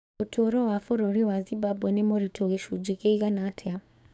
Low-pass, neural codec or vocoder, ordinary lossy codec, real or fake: none; codec, 16 kHz, 6 kbps, DAC; none; fake